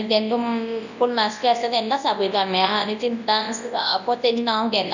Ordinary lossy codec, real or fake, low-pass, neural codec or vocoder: none; fake; 7.2 kHz; codec, 24 kHz, 0.9 kbps, WavTokenizer, large speech release